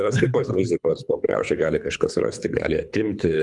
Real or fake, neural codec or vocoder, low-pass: fake; codec, 24 kHz, 3 kbps, HILCodec; 10.8 kHz